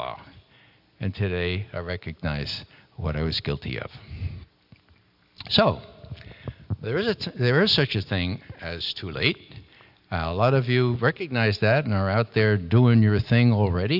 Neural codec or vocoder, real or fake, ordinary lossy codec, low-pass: none; real; AAC, 48 kbps; 5.4 kHz